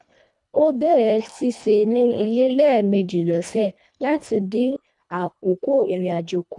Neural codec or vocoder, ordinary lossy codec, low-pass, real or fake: codec, 24 kHz, 1.5 kbps, HILCodec; none; 10.8 kHz; fake